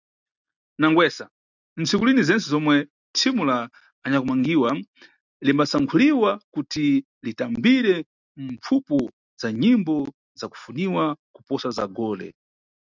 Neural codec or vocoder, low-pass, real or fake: none; 7.2 kHz; real